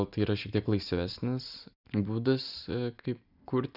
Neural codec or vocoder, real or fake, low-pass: none; real; 5.4 kHz